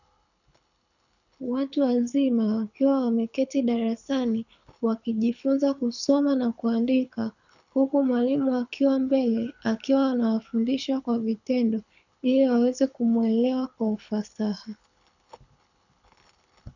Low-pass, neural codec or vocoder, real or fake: 7.2 kHz; codec, 24 kHz, 6 kbps, HILCodec; fake